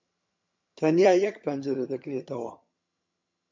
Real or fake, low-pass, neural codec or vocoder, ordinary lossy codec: fake; 7.2 kHz; vocoder, 22.05 kHz, 80 mel bands, HiFi-GAN; MP3, 48 kbps